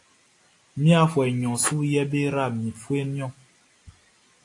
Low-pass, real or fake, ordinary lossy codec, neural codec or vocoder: 10.8 kHz; real; AAC, 48 kbps; none